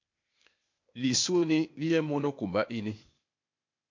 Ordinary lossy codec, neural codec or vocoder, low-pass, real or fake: MP3, 48 kbps; codec, 16 kHz, 0.8 kbps, ZipCodec; 7.2 kHz; fake